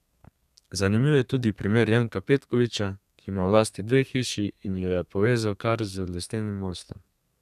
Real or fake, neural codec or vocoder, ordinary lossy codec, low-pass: fake; codec, 32 kHz, 1.9 kbps, SNAC; none; 14.4 kHz